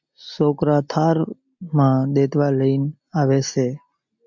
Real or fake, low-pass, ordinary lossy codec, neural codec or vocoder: real; 7.2 kHz; MP3, 64 kbps; none